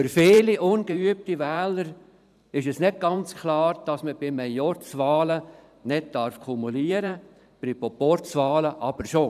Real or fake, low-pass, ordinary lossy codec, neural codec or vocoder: fake; 14.4 kHz; none; vocoder, 44.1 kHz, 128 mel bands every 512 samples, BigVGAN v2